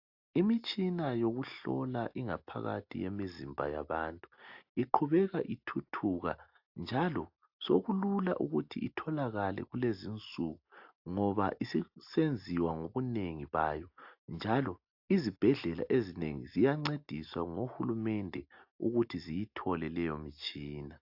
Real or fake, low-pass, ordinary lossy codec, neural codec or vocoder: real; 5.4 kHz; AAC, 48 kbps; none